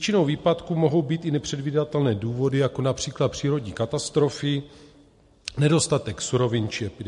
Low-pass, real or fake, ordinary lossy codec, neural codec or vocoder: 14.4 kHz; real; MP3, 48 kbps; none